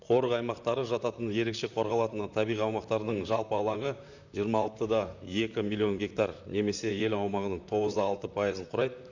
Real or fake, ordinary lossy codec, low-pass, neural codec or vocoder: fake; none; 7.2 kHz; vocoder, 44.1 kHz, 128 mel bands, Pupu-Vocoder